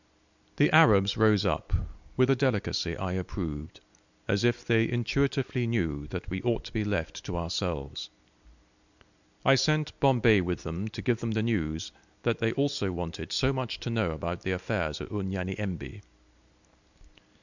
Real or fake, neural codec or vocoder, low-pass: real; none; 7.2 kHz